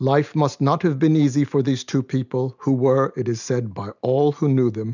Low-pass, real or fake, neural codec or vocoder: 7.2 kHz; real; none